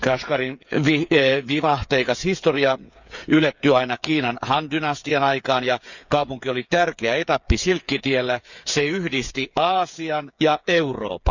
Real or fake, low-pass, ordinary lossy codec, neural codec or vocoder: fake; 7.2 kHz; none; codec, 16 kHz, 8 kbps, FreqCodec, smaller model